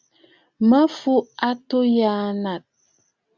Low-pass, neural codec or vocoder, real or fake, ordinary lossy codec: 7.2 kHz; none; real; Opus, 64 kbps